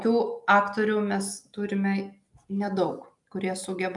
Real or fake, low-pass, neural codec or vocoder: real; 10.8 kHz; none